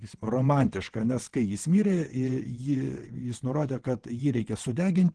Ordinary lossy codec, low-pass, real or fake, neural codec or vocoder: Opus, 16 kbps; 9.9 kHz; fake; vocoder, 22.05 kHz, 80 mel bands, WaveNeXt